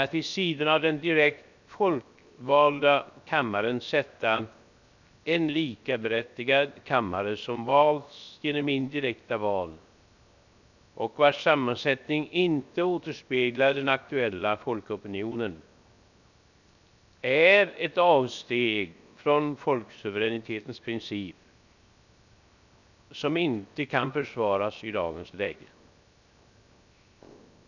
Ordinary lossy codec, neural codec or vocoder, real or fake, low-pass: none; codec, 16 kHz, 0.7 kbps, FocalCodec; fake; 7.2 kHz